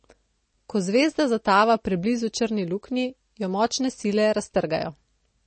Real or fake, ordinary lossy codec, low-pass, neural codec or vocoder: real; MP3, 32 kbps; 9.9 kHz; none